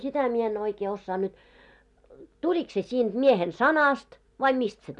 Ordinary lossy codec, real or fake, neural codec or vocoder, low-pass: none; real; none; 10.8 kHz